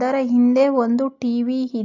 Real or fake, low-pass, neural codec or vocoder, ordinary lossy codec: real; 7.2 kHz; none; AAC, 48 kbps